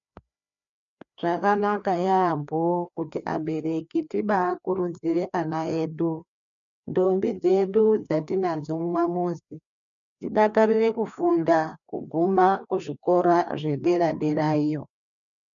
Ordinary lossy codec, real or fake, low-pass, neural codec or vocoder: MP3, 96 kbps; fake; 7.2 kHz; codec, 16 kHz, 2 kbps, FreqCodec, larger model